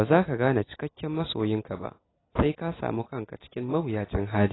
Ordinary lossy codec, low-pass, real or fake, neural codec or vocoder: AAC, 16 kbps; 7.2 kHz; real; none